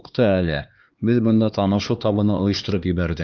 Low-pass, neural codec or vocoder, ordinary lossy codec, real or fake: 7.2 kHz; codec, 16 kHz, 2 kbps, X-Codec, HuBERT features, trained on LibriSpeech; Opus, 32 kbps; fake